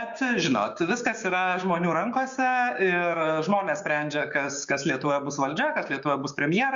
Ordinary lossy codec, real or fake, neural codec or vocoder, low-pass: Opus, 64 kbps; fake; codec, 16 kHz, 6 kbps, DAC; 7.2 kHz